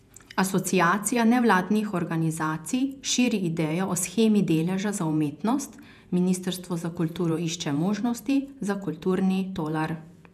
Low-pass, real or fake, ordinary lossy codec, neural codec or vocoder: 14.4 kHz; fake; none; vocoder, 48 kHz, 128 mel bands, Vocos